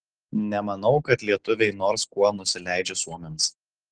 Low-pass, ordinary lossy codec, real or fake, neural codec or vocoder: 9.9 kHz; Opus, 16 kbps; real; none